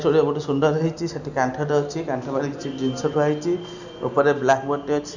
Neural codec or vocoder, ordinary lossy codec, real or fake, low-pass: none; none; real; 7.2 kHz